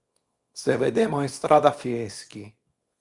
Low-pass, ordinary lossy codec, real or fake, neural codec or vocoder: 10.8 kHz; Opus, 64 kbps; fake; codec, 24 kHz, 0.9 kbps, WavTokenizer, small release